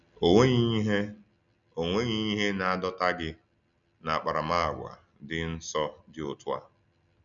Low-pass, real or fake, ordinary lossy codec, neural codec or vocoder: 7.2 kHz; real; none; none